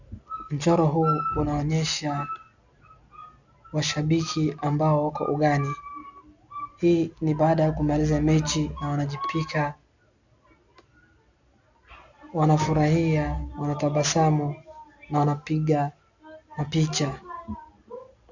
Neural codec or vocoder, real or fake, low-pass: none; real; 7.2 kHz